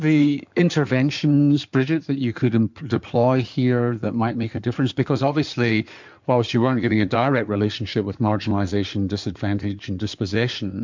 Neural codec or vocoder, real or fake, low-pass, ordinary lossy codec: codec, 16 kHz in and 24 kHz out, 2.2 kbps, FireRedTTS-2 codec; fake; 7.2 kHz; MP3, 64 kbps